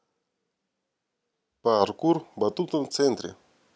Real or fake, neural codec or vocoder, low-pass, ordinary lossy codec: real; none; none; none